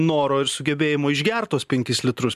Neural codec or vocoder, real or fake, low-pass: none; real; 14.4 kHz